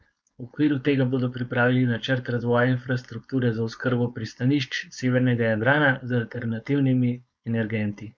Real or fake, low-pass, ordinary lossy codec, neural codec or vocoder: fake; none; none; codec, 16 kHz, 4.8 kbps, FACodec